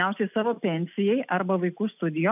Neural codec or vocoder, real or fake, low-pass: none; real; 3.6 kHz